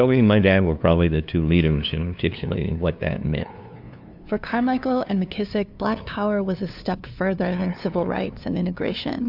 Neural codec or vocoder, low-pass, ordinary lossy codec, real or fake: codec, 16 kHz, 2 kbps, FunCodec, trained on LibriTTS, 25 frames a second; 5.4 kHz; AAC, 48 kbps; fake